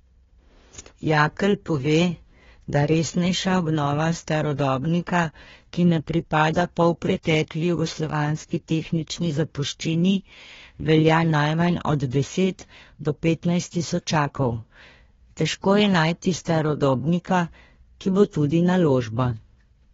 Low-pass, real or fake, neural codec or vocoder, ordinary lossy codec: 7.2 kHz; fake; codec, 16 kHz, 1 kbps, FunCodec, trained on Chinese and English, 50 frames a second; AAC, 24 kbps